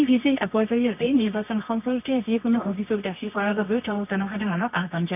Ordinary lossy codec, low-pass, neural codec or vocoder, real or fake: none; 3.6 kHz; codec, 24 kHz, 0.9 kbps, WavTokenizer, medium music audio release; fake